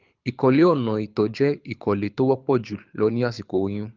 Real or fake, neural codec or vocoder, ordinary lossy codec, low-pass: fake; codec, 24 kHz, 6 kbps, HILCodec; Opus, 24 kbps; 7.2 kHz